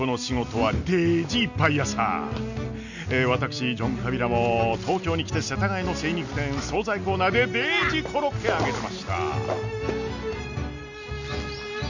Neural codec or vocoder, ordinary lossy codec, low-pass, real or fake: none; none; 7.2 kHz; real